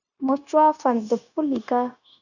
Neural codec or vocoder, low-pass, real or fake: codec, 16 kHz, 0.9 kbps, LongCat-Audio-Codec; 7.2 kHz; fake